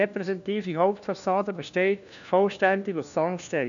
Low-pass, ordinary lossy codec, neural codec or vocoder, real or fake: 7.2 kHz; none; codec, 16 kHz, 1 kbps, FunCodec, trained on LibriTTS, 50 frames a second; fake